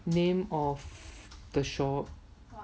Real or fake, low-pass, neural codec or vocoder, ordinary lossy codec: real; none; none; none